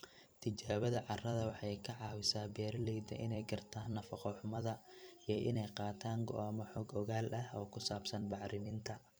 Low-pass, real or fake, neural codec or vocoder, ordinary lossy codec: none; fake; vocoder, 44.1 kHz, 128 mel bands every 256 samples, BigVGAN v2; none